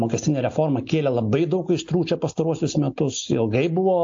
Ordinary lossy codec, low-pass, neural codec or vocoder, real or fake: AAC, 48 kbps; 7.2 kHz; none; real